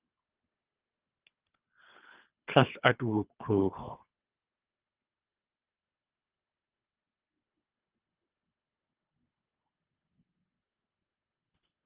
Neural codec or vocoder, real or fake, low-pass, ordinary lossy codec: codec, 24 kHz, 3 kbps, HILCodec; fake; 3.6 kHz; Opus, 24 kbps